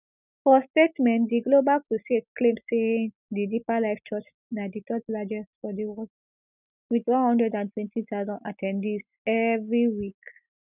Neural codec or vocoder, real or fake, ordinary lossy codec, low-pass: none; real; none; 3.6 kHz